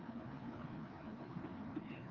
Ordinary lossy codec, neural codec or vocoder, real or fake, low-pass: Opus, 24 kbps; codec, 16 kHz, 2 kbps, FreqCodec, larger model; fake; 7.2 kHz